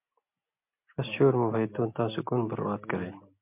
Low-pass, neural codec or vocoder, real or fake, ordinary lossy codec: 3.6 kHz; none; real; MP3, 32 kbps